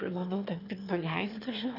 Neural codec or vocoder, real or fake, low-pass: autoencoder, 22.05 kHz, a latent of 192 numbers a frame, VITS, trained on one speaker; fake; 5.4 kHz